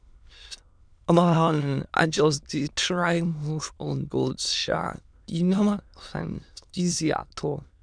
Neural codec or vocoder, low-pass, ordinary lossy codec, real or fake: autoencoder, 22.05 kHz, a latent of 192 numbers a frame, VITS, trained on many speakers; 9.9 kHz; none; fake